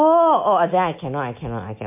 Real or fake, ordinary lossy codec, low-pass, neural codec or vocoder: real; MP3, 24 kbps; 3.6 kHz; none